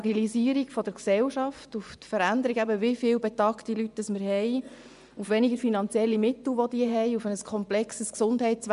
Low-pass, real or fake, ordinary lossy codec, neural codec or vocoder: 10.8 kHz; fake; none; vocoder, 24 kHz, 100 mel bands, Vocos